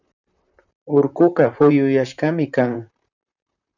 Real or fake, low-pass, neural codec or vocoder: fake; 7.2 kHz; vocoder, 44.1 kHz, 128 mel bands, Pupu-Vocoder